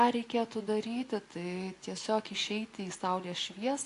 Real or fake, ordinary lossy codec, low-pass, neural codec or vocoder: real; AAC, 48 kbps; 10.8 kHz; none